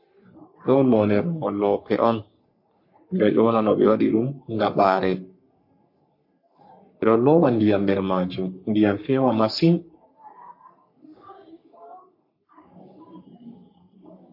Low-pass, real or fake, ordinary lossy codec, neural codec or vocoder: 5.4 kHz; fake; MP3, 32 kbps; codec, 44.1 kHz, 3.4 kbps, Pupu-Codec